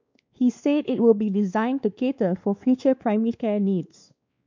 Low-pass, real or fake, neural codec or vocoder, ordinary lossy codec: 7.2 kHz; fake; codec, 16 kHz, 2 kbps, X-Codec, WavLM features, trained on Multilingual LibriSpeech; MP3, 64 kbps